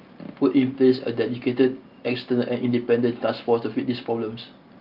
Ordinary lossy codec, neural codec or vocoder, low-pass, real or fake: Opus, 24 kbps; none; 5.4 kHz; real